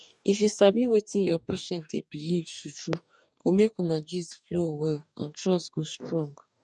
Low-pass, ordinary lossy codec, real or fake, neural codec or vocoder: 10.8 kHz; none; fake; codec, 44.1 kHz, 2.6 kbps, DAC